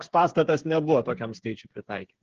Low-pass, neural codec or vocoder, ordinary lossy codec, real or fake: 7.2 kHz; codec, 16 kHz, 8 kbps, FreqCodec, smaller model; Opus, 16 kbps; fake